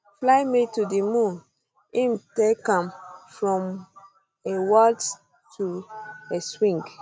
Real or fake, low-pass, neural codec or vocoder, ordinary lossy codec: real; none; none; none